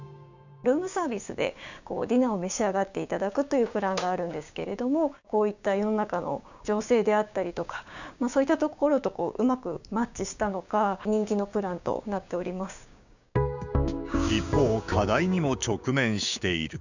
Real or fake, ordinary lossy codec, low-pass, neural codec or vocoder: fake; none; 7.2 kHz; codec, 16 kHz, 6 kbps, DAC